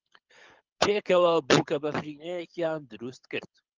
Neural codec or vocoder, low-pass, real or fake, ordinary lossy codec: codec, 24 kHz, 6 kbps, HILCodec; 7.2 kHz; fake; Opus, 32 kbps